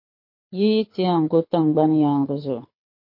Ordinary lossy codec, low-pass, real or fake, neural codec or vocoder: MP3, 32 kbps; 5.4 kHz; fake; codec, 24 kHz, 6 kbps, HILCodec